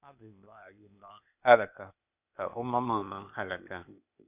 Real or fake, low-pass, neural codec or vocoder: fake; 3.6 kHz; codec, 16 kHz, 0.8 kbps, ZipCodec